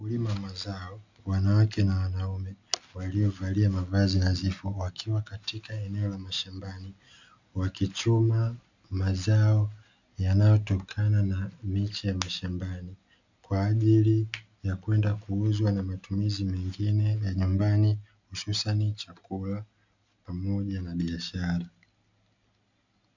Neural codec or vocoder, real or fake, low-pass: none; real; 7.2 kHz